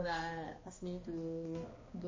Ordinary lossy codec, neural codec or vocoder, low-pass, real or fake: MP3, 32 kbps; codec, 16 kHz, 2 kbps, X-Codec, HuBERT features, trained on balanced general audio; 7.2 kHz; fake